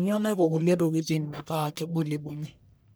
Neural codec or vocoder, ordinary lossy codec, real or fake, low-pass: codec, 44.1 kHz, 1.7 kbps, Pupu-Codec; none; fake; none